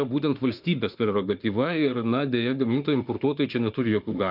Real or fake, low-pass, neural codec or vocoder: fake; 5.4 kHz; autoencoder, 48 kHz, 32 numbers a frame, DAC-VAE, trained on Japanese speech